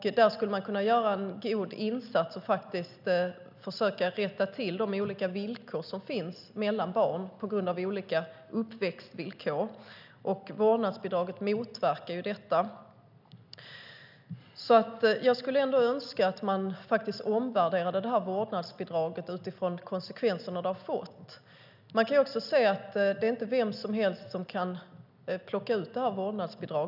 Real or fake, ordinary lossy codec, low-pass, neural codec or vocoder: real; none; 5.4 kHz; none